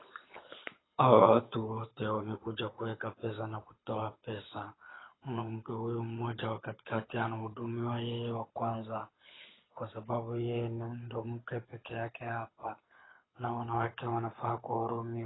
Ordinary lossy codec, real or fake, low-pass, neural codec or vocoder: AAC, 16 kbps; fake; 7.2 kHz; codec, 24 kHz, 6 kbps, HILCodec